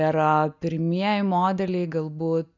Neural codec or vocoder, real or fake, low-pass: none; real; 7.2 kHz